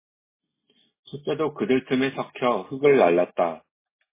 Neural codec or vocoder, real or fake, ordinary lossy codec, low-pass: none; real; MP3, 16 kbps; 3.6 kHz